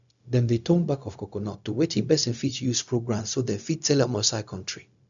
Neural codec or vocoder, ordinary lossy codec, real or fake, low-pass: codec, 16 kHz, 0.4 kbps, LongCat-Audio-Codec; MP3, 96 kbps; fake; 7.2 kHz